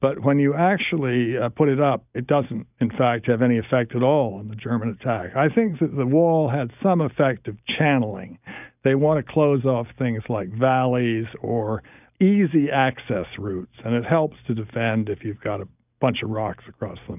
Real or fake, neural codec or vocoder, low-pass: real; none; 3.6 kHz